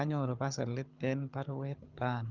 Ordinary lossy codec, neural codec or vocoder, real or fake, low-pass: Opus, 24 kbps; codec, 24 kHz, 6 kbps, HILCodec; fake; 7.2 kHz